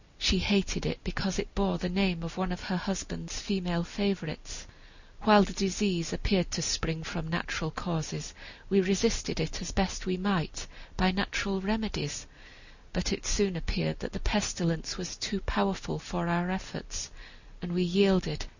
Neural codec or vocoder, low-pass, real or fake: none; 7.2 kHz; real